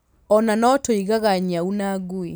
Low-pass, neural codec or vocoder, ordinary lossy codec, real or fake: none; none; none; real